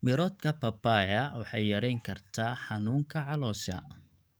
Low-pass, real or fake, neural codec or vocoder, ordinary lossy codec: none; fake; codec, 44.1 kHz, 7.8 kbps, DAC; none